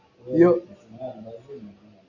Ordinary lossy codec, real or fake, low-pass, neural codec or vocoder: Opus, 64 kbps; real; 7.2 kHz; none